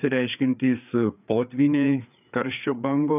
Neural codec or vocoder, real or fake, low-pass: codec, 16 kHz, 4 kbps, FreqCodec, larger model; fake; 3.6 kHz